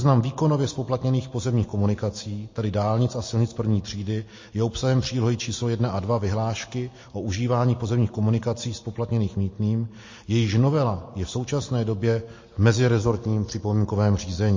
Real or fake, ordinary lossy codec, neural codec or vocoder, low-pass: real; MP3, 32 kbps; none; 7.2 kHz